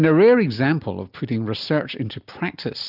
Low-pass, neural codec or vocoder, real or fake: 5.4 kHz; none; real